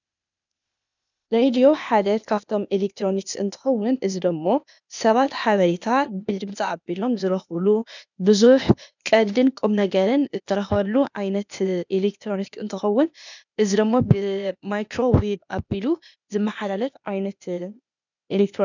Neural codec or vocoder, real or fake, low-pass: codec, 16 kHz, 0.8 kbps, ZipCodec; fake; 7.2 kHz